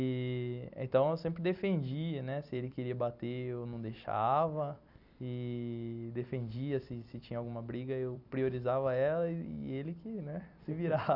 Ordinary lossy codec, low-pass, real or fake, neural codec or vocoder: none; 5.4 kHz; fake; vocoder, 44.1 kHz, 128 mel bands every 256 samples, BigVGAN v2